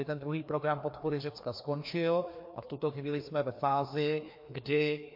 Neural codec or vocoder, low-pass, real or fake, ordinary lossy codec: codec, 16 kHz, 2 kbps, FreqCodec, larger model; 5.4 kHz; fake; MP3, 32 kbps